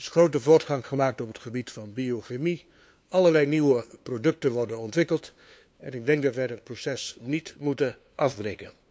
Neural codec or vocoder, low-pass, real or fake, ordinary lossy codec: codec, 16 kHz, 2 kbps, FunCodec, trained on LibriTTS, 25 frames a second; none; fake; none